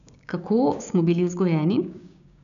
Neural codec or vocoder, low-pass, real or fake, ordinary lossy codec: codec, 16 kHz, 6 kbps, DAC; 7.2 kHz; fake; none